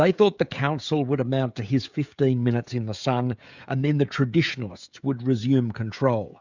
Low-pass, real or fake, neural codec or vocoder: 7.2 kHz; fake; codec, 16 kHz, 16 kbps, FreqCodec, smaller model